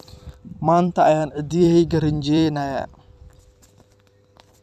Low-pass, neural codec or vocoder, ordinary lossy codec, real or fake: 14.4 kHz; vocoder, 44.1 kHz, 128 mel bands every 256 samples, BigVGAN v2; none; fake